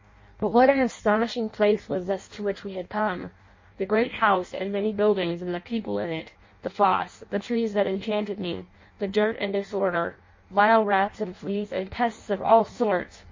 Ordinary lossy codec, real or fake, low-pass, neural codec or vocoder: MP3, 32 kbps; fake; 7.2 kHz; codec, 16 kHz in and 24 kHz out, 0.6 kbps, FireRedTTS-2 codec